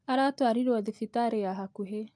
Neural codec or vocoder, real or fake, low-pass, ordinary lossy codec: none; real; 9.9 kHz; MP3, 64 kbps